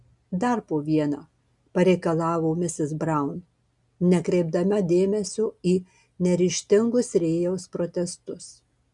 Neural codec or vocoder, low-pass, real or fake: none; 10.8 kHz; real